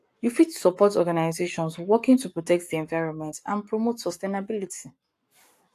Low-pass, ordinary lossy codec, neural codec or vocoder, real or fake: 14.4 kHz; AAC, 64 kbps; codec, 44.1 kHz, 7.8 kbps, Pupu-Codec; fake